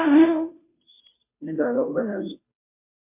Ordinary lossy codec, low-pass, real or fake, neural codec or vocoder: MP3, 24 kbps; 3.6 kHz; fake; codec, 16 kHz, 0.5 kbps, FunCodec, trained on Chinese and English, 25 frames a second